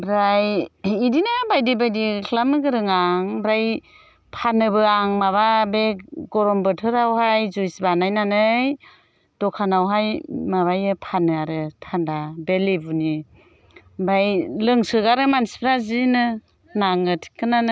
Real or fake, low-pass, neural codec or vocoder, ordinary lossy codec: real; none; none; none